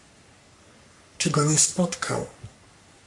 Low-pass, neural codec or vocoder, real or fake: 10.8 kHz; codec, 44.1 kHz, 3.4 kbps, Pupu-Codec; fake